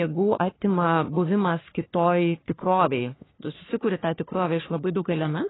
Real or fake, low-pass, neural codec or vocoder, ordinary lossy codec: fake; 7.2 kHz; codec, 44.1 kHz, 3.4 kbps, Pupu-Codec; AAC, 16 kbps